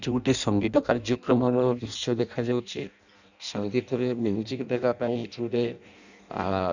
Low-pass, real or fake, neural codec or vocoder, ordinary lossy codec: 7.2 kHz; fake; codec, 16 kHz in and 24 kHz out, 0.6 kbps, FireRedTTS-2 codec; none